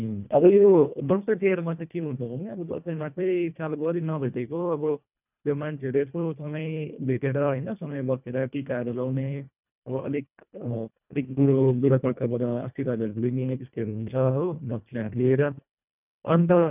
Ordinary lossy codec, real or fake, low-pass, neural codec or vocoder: none; fake; 3.6 kHz; codec, 24 kHz, 1.5 kbps, HILCodec